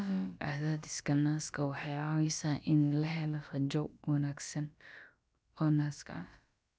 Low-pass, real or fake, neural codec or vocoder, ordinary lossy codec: none; fake; codec, 16 kHz, about 1 kbps, DyCAST, with the encoder's durations; none